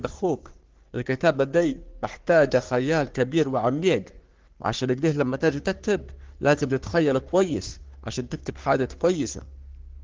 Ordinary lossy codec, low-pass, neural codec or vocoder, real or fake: Opus, 16 kbps; 7.2 kHz; codec, 44.1 kHz, 3.4 kbps, Pupu-Codec; fake